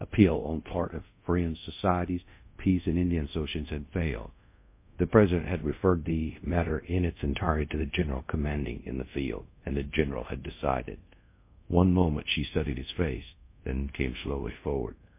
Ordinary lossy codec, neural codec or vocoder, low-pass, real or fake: MP3, 24 kbps; codec, 24 kHz, 0.5 kbps, DualCodec; 3.6 kHz; fake